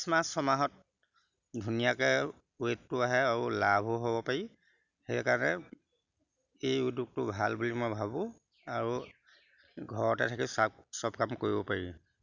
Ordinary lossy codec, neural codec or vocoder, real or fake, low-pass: none; none; real; 7.2 kHz